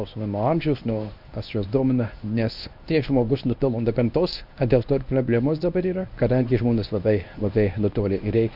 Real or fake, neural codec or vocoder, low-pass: fake; codec, 24 kHz, 0.9 kbps, WavTokenizer, medium speech release version 1; 5.4 kHz